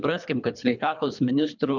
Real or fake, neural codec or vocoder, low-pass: fake; codec, 24 kHz, 3 kbps, HILCodec; 7.2 kHz